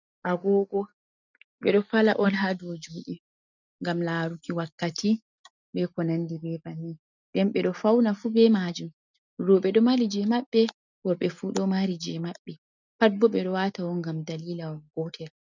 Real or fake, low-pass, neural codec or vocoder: real; 7.2 kHz; none